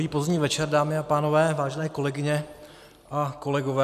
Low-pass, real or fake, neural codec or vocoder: 14.4 kHz; real; none